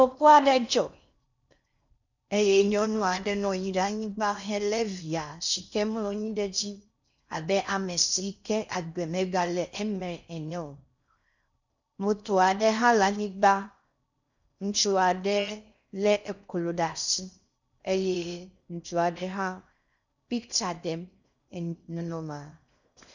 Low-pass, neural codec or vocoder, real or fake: 7.2 kHz; codec, 16 kHz in and 24 kHz out, 0.6 kbps, FocalCodec, streaming, 4096 codes; fake